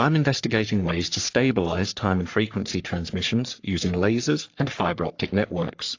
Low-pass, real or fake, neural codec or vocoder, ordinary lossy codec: 7.2 kHz; fake; codec, 44.1 kHz, 3.4 kbps, Pupu-Codec; AAC, 48 kbps